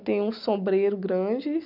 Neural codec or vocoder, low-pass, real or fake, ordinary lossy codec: none; 5.4 kHz; real; none